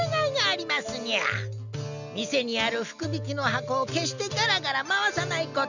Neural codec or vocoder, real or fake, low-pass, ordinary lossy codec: none; real; 7.2 kHz; none